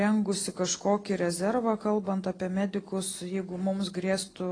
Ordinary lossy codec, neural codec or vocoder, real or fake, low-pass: AAC, 32 kbps; none; real; 9.9 kHz